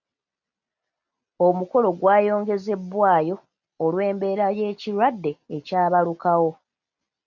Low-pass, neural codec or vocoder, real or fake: 7.2 kHz; none; real